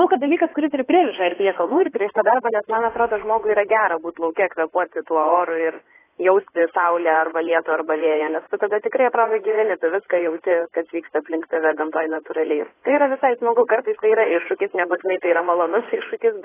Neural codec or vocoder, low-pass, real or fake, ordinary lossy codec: codec, 16 kHz in and 24 kHz out, 2.2 kbps, FireRedTTS-2 codec; 3.6 kHz; fake; AAC, 16 kbps